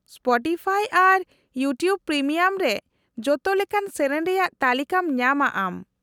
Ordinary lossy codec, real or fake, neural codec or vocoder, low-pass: none; real; none; 19.8 kHz